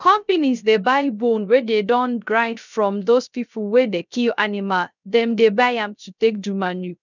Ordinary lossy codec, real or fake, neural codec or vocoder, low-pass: none; fake; codec, 16 kHz, 0.3 kbps, FocalCodec; 7.2 kHz